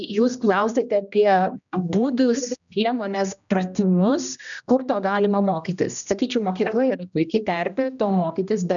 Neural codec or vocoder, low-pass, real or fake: codec, 16 kHz, 1 kbps, X-Codec, HuBERT features, trained on general audio; 7.2 kHz; fake